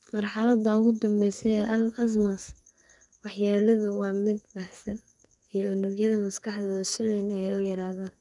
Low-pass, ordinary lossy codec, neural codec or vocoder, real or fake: 10.8 kHz; none; codec, 44.1 kHz, 2.6 kbps, SNAC; fake